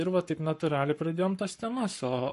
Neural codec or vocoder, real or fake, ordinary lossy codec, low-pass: codec, 44.1 kHz, 7.8 kbps, Pupu-Codec; fake; MP3, 48 kbps; 14.4 kHz